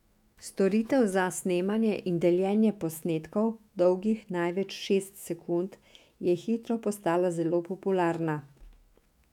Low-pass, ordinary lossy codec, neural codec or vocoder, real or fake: 19.8 kHz; none; autoencoder, 48 kHz, 128 numbers a frame, DAC-VAE, trained on Japanese speech; fake